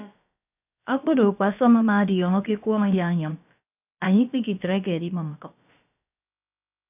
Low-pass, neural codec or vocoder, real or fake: 3.6 kHz; codec, 16 kHz, about 1 kbps, DyCAST, with the encoder's durations; fake